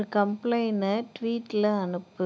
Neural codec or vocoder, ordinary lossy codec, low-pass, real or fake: none; none; none; real